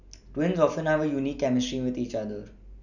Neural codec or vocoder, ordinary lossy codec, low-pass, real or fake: none; none; 7.2 kHz; real